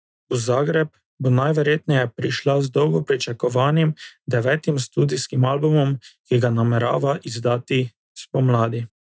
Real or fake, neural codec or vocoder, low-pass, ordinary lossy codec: real; none; none; none